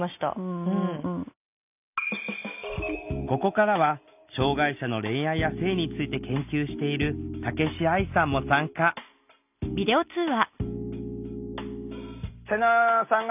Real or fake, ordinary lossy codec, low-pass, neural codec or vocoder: real; none; 3.6 kHz; none